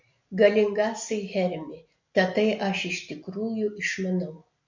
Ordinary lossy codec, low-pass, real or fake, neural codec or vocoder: MP3, 48 kbps; 7.2 kHz; real; none